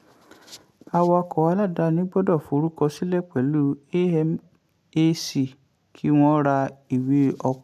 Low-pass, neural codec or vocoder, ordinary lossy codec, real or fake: 14.4 kHz; none; none; real